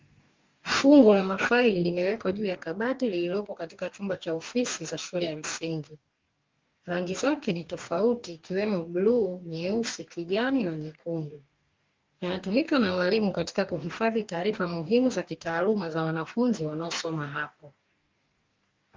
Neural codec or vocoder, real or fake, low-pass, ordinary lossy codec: codec, 44.1 kHz, 2.6 kbps, DAC; fake; 7.2 kHz; Opus, 32 kbps